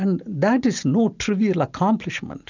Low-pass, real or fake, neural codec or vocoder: 7.2 kHz; real; none